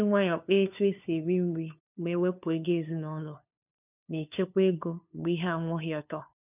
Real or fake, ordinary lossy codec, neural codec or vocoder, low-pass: fake; none; codec, 16 kHz, 2 kbps, FunCodec, trained on LibriTTS, 25 frames a second; 3.6 kHz